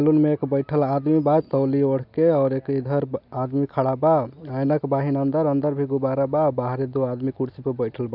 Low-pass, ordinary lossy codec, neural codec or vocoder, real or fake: 5.4 kHz; none; none; real